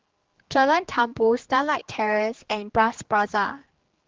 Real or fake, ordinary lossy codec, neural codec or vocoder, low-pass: fake; Opus, 16 kbps; codec, 16 kHz, 2 kbps, X-Codec, HuBERT features, trained on general audio; 7.2 kHz